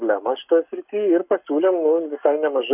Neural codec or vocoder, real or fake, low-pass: codec, 44.1 kHz, 7.8 kbps, Pupu-Codec; fake; 3.6 kHz